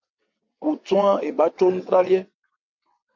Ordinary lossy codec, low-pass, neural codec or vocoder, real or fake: MP3, 48 kbps; 7.2 kHz; vocoder, 22.05 kHz, 80 mel bands, WaveNeXt; fake